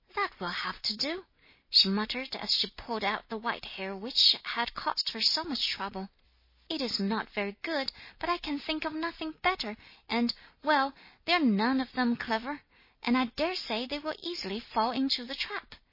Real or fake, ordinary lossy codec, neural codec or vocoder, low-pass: real; MP3, 24 kbps; none; 5.4 kHz